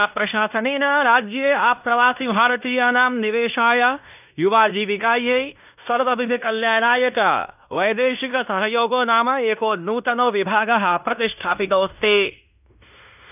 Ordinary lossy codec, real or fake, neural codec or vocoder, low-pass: none; fake; codec, 16 kHz in and 24 kHz out, 0.9 kbps, LongCat-Audio-Codec, fine tuned four codebook decoder; 3.6 kHz